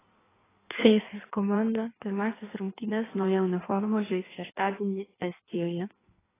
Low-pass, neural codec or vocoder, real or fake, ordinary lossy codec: 3.6 kHz; codec, 16 kHz in and 24 kHz out, 1.1 kbps, FireRedTTS-2 codec; fake; AAC, 16 kbps